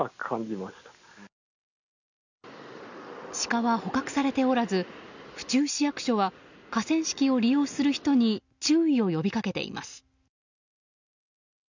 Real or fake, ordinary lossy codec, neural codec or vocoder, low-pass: real; none; none; 7.2 kHz